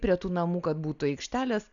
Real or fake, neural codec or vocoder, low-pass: real; none; 7.2 kHz